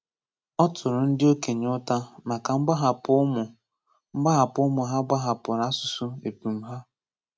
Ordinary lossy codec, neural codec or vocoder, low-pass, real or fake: none; none; none; real